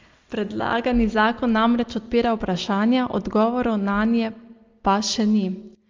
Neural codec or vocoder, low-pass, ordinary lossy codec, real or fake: none; 7.2 kHz; Opus, 32 kbps; real